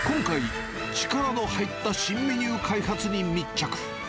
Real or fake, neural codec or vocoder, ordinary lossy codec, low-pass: real; none; none; none